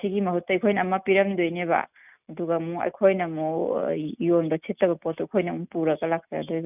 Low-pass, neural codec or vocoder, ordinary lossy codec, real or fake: 3.6 kHz; none; none; real